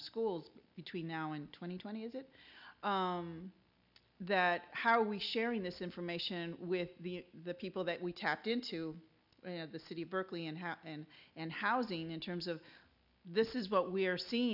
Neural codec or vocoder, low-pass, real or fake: none; 5.4 kHz; real